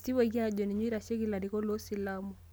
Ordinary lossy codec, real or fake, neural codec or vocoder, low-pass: none; real; none; none